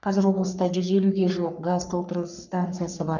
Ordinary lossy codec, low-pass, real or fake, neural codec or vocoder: none; 7.2 kHz; fake; codec, 44.1 kHz, 3.4 kbps, Pupu-Codec